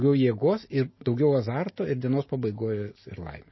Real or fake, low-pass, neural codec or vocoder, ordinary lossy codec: real; 7.2 kHz; none; MP3, 24 kbps